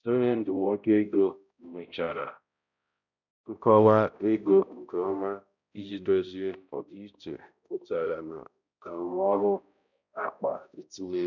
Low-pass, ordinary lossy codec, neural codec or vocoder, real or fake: 7.2 kHz; none; codec, 16 kHz, 0.5 kbps, X-Codec, HuBERT features, trained on balanced general audio; fake